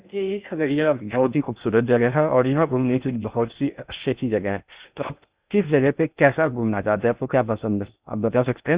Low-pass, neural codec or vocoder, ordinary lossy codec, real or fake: 3.6 kHz; codec, 16 kHz in and 24 kHz out, 0.6 kbps, FocalCodec, streaming, 2048 codes; Opus, 64 kbps; fake